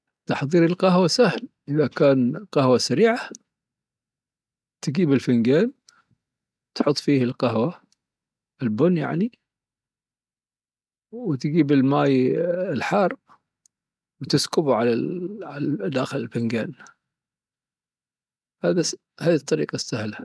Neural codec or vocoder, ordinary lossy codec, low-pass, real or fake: none; none; none; real